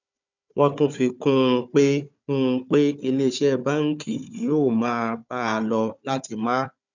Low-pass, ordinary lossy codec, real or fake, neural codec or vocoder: 7.2 kHz; none; fake; codec, 16 kHz, 4 kbps, FunCodec, trained on Chinese and English, 50 frames a second